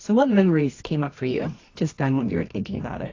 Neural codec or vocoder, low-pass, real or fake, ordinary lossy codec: codec, 24 kHz, 0.9 kbps, WavTokenizer, medium music audio release; 7.2 kHz; fake; AAC, 32 kbps